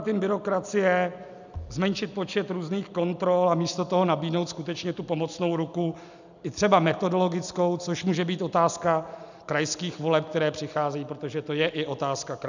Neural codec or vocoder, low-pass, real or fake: none; 7.2 kHz; real